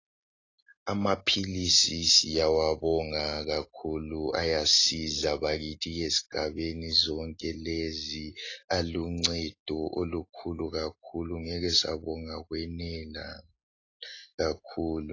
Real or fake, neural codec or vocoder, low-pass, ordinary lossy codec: real; none; 7.2 kHz; AAC, 32 kbps